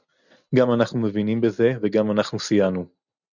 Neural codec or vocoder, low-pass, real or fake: none; 7.2 kHz; real